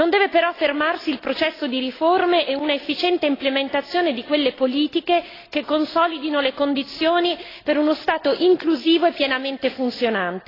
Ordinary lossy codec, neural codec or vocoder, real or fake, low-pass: AAC, 24 kbps; none; real; 5.4 kHz